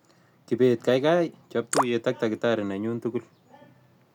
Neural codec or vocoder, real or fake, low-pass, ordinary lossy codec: none; real; 19.8 kHz; none